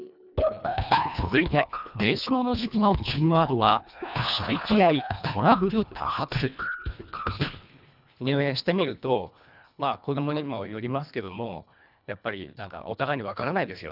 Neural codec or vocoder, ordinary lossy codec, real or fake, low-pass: codec, 24 kHz, 1.5 kbps, HILCodec; none; fake; 5.4 kHz